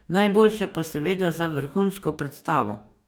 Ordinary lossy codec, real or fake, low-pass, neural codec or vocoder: none; fake; none; codec, 44.1 kHz, 2.6 kbps, DAC